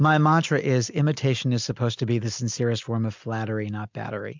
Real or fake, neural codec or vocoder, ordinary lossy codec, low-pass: real; none; MP3, 64 kbps; 7.2 kHz